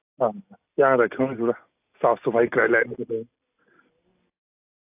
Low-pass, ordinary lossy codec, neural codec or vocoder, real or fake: 3.6 kHz; AAC, 32 kbps; none; real